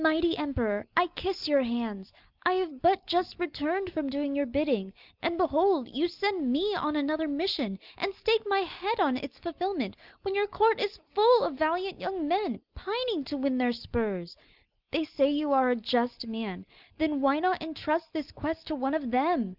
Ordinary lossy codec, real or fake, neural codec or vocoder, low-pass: Opus, 24 kbps; real; none; 5.4 kHz